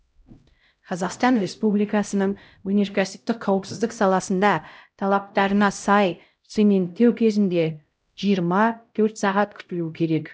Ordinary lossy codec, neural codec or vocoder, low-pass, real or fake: none; codec, 16 kHz, 0.5 kbps, X-Codec, HuBERT features, trained on LibriSpeech; none; fake